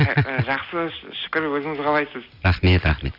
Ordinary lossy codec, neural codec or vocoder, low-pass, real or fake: none; none; 5.4 kHz; real